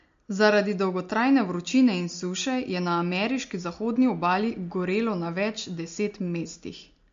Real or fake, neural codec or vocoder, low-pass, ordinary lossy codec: real; none; 7.2 kHz; MP3, 48 kbps